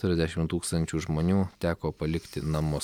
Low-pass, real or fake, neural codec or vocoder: 19.8 kHz; real; none